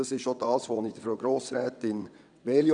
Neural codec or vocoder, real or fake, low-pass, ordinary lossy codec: vocoder, 22.05 kHz, 80 mel bands, WaveNeXt; fake; 9.9 kHz; none